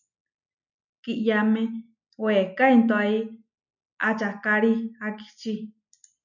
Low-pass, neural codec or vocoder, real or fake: 7.2 kHz; none; real